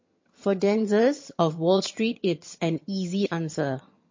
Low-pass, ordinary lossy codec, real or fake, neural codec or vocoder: 7.2 kHz; MP3, 32 kbps; fake; vocoder, 22.05 kHz, 80 mel bands, HiFi-GAN